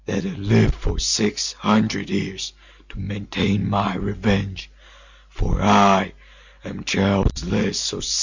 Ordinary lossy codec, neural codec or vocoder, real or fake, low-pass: Opus, 64 kbps; none; real; 7.2 kHz